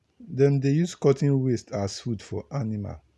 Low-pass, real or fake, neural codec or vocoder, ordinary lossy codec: none; real; none; none